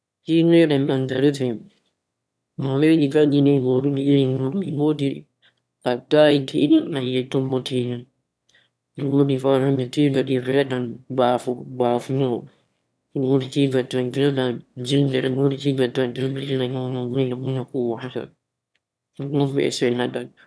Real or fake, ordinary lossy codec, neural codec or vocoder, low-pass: fake; none; autoencoder, 22.05 kHz, a latent of 192 numbers a frame, VITS, trained on one speaker; none